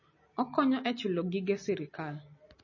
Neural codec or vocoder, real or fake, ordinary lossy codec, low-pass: none; real; MP3, 32 kbps; 7.2 kHz